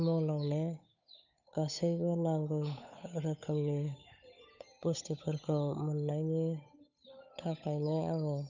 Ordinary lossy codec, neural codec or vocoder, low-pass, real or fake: none; codec, 16 kHz, 8 kbps, FunCodec, trained on Chinese and English, 25 frames a second; 7.2 kHz; fake